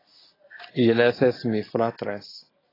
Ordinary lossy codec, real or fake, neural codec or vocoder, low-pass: AAC, 24 kbps; real; none; 5.4 kHz